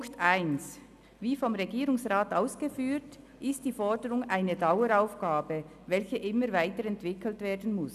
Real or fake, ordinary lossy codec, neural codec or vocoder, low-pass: real; none; none; 14.4 kHz